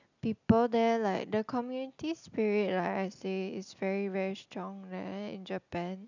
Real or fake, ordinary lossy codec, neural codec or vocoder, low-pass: real; none; none; 7.2 kHz